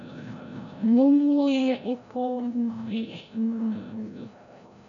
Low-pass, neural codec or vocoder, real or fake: 7.2 kHz; codec, 16 kHz, 0.5 kbps, FreqCodec, larger model; fake